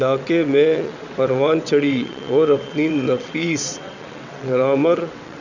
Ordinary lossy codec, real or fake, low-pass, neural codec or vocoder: none; fake; 7.2 kHz; vocoder, 22.05 kHz, 80 mel bands, WaveNeXt